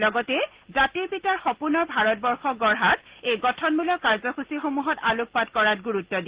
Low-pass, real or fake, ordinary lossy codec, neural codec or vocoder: 3.6 kHz; real; Opus, 16 kbps; none